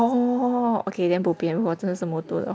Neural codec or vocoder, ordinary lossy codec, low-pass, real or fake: none; none; none; real